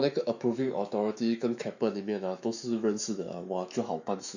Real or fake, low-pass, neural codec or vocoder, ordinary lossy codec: fake; 7.2 kHz; autoencoder, 48 kHz, 128 numbers a frame, DAC-VAE, trained on Japanese speech; none